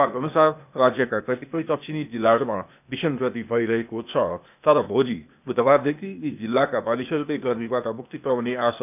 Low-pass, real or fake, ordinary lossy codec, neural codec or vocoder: 3.6 kHz; fake; none; codec, 16 kHz, 0.8 kbps, ZipCodec